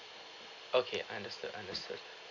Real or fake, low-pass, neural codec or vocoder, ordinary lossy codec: real; 7.2 kHz; none; none